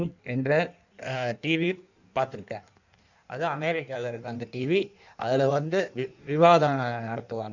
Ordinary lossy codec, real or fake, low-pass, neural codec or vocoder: none; fake; 7.2 kHz; codec, 16 kHz in and 24 kHz out, 1.1 kbps, FireRedTTS-2 codec